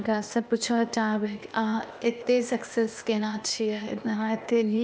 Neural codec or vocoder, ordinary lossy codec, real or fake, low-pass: codec, 16 kHz, 0.8 kbps, ZipCodec; none; fake; none